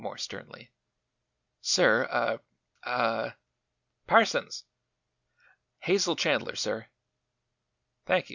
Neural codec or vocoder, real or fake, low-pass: none; real; 7.2 kHz